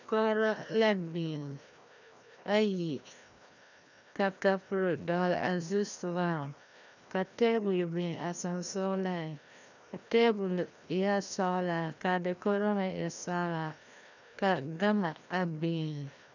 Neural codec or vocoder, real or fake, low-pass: codec, 16 kHz, 1 kbps, FreqCodec, larger model; fake; 7.2 kHz